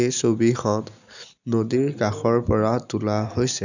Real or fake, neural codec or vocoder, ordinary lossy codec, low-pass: real; none; none; 7.2 kHz